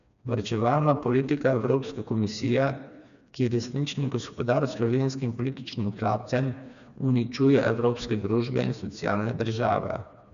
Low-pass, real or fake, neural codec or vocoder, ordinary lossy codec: 7.2 kHz; fake; codec, 16 kHz, 2 kbps, FreqCodec, smaller model; none